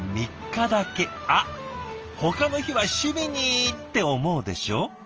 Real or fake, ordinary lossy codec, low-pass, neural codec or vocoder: real; Opus, 24 kbps; 7.2 kHz; none